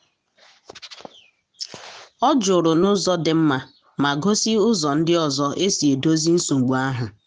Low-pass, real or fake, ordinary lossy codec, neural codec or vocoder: none; real; none; none